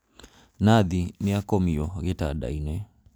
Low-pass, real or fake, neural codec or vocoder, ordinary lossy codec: none; real; none; none